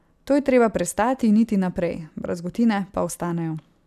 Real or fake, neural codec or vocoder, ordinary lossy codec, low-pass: real; none; AAC, 96 kbps; 14.4 kHz